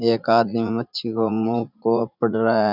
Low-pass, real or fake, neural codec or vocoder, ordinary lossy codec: 5.4 kHz; fake; vocoder, 44.1 kHz, 80 mel bands, Vocos; none